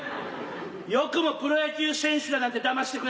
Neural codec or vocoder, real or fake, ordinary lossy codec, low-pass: none; real; none; none